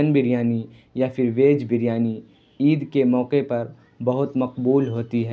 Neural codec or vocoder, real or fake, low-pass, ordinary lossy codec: none; real; none; none